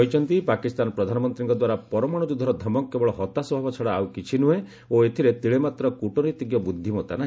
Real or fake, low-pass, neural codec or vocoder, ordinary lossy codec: real; none; none; none